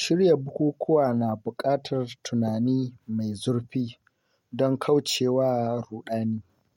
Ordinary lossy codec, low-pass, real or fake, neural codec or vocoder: MP3, 64 kbps; 19.8 kHz; real; none